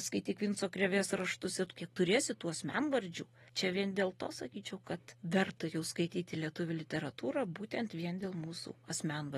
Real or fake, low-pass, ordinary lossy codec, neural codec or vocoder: real; 19.8 kHz; AAC, 32 kbps; none